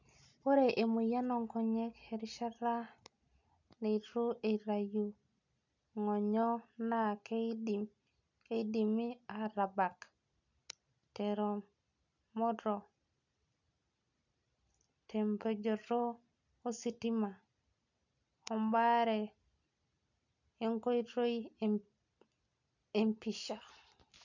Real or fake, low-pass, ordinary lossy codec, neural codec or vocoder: real; 7.2 kHz; none; none